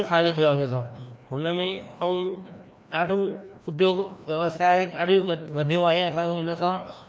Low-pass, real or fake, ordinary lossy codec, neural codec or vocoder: none; fake; none; codec, 16 kHz, 1 kbps, FreqCodec, larger model